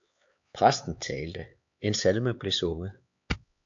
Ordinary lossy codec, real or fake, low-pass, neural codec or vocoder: AAC, 64 kbps; fake; 7.2 kHz; codec, 16 kHz, 4 kbps, X-Codec, HuBERT features, trained on LibriSpeech